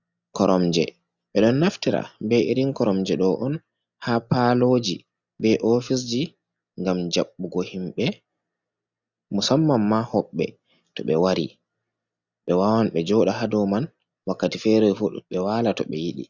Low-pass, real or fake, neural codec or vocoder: 7.2 kHz; real; none